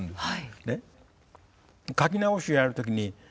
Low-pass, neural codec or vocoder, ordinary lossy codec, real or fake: none; none; none; real